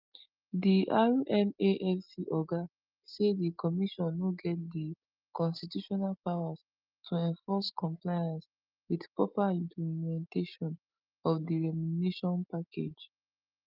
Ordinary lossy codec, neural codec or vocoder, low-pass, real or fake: Opus, 24 kbps; none; 5.4 kHz; real